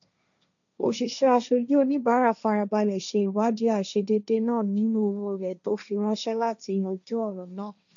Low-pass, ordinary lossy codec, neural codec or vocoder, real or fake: 7.2 kHz; none; codec, 16 kHz, 1.1 kbps, Voila-Tokenizer; fake